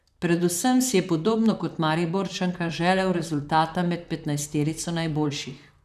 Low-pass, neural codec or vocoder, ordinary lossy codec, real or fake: 14.4 kHz; vocoder, 44.1 kHz, 128 mel bands, Pupu-Vocoder; none; fake